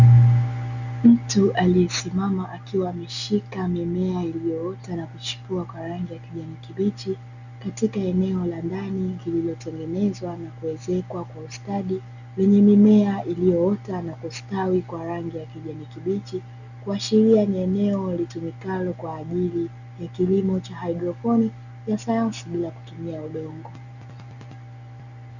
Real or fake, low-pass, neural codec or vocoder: real; 7.2 kHz; none